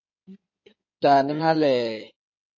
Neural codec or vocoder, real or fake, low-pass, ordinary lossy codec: codec, 16 kHz in and 24 kHz out, 2.2 kbps, FireRedTTS-2 codec; fake; 7.2 kHz; MP3, 32 kbps